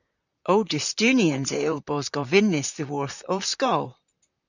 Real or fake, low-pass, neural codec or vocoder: fake; 7.2 kHz; vocoder, 44.1 kHz, 128 mel bands, Pupu-Vocoder